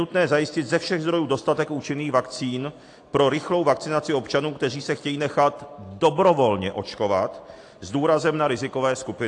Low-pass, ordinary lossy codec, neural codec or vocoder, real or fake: 10.8 kHz; AAC, 48 kbps; none; real